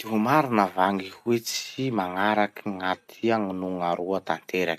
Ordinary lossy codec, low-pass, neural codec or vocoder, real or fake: none; 14.4 kHz; none; real